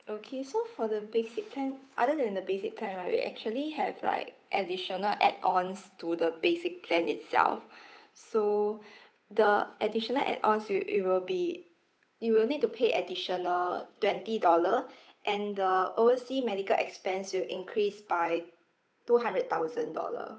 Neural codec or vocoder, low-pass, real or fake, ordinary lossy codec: codec, 16 kHz, 8 kbps, FunCodec, trained on Chinese and English, 25 frames a second; none; fake; none